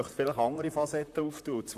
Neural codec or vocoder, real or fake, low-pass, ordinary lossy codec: vocoder, 44.1 kHz, 128 mel bands, Pupu-Vocoder; fake; 14.4 kHz; none